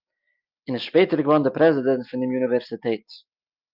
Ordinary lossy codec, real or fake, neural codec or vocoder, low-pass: Opus, 24 kbps; real; none; 5.4 kHz